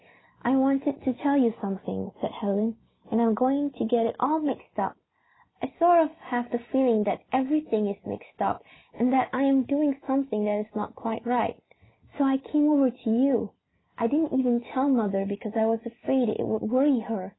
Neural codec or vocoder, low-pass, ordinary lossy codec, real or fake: none; 7.2 kHz; AAC, 16 kbps; real